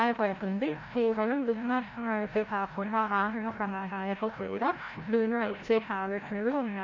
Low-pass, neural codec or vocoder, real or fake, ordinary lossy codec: 7.2 kHz; codec, 16 kHz, 0.5 kbps, FreqCodec, larger model; fake; MP3, 48 kbps